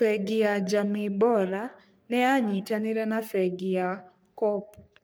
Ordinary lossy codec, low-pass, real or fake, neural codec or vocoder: none; none; fake; codec, 44.1 kHz, 3.4 kbps, Pupu-Codec